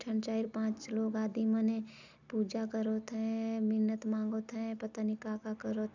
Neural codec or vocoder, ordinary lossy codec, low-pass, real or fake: none; none; 7.2 kHz; real